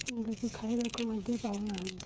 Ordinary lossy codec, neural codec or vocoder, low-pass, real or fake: none; codec, 16 kHz, 8 kbps, FreqCodec, smaller model; none; fake